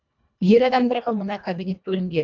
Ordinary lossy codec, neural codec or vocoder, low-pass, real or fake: none; codec, 24 kHz, 1.5 kbps, HILCodec; 7.2 kHz; fake